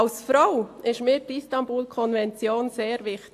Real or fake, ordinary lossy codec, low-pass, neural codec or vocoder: real; AAC, 64 kbps; 14.4 kHz; none